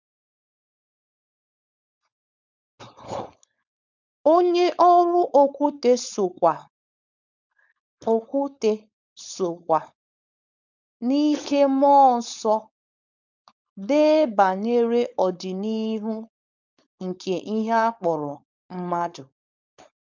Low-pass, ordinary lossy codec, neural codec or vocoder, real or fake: 7.2 kHz; none; codec, 16 kHz, 4.8 kbps, FACodec; fake